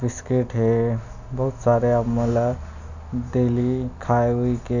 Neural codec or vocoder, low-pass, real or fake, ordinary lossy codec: none; 7.2 kHz; real; none